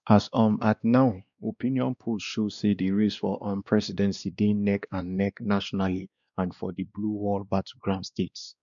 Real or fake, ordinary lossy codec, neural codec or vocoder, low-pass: fake; none; codec, 16 kHz, 2 kbps, X-Codec, WavLM features, trained on Multilingual LibriSpeech; 7.2 kHz